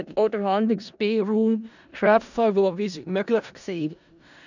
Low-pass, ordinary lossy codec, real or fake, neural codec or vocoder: 7.2 kHz; none; fake; codec, 16 kHz in and 24 kHz out, 0.4 kbps, LongCat-Audio-Codec, four codebook decoder